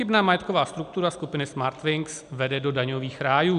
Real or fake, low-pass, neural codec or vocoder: real; 10.8 kHz; none